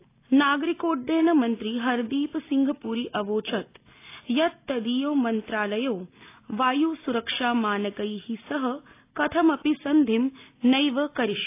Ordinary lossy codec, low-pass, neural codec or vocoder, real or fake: AAC, 24 kbps; 3.6 kHz; none; real